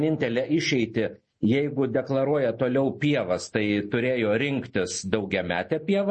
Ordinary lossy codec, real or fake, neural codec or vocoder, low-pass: MP3, 32 kbps; real; none; 10.8 kHz